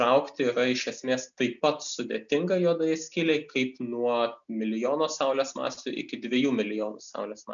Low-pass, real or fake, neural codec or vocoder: 7.2 kHz; real; none